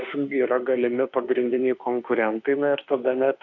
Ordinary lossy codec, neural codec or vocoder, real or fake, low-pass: AAC, 48 kbps; autoencoder, 48 kHz, 32 numbers a frame, DAC-VAE, trained on Japanese speech; fake; 7.2 kHz